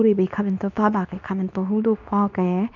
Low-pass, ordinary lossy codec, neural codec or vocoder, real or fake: 7.2 kHz; none; codec, 24 kHz, 0.9 kbps, WavTokenizer, medium speech release version 2; fake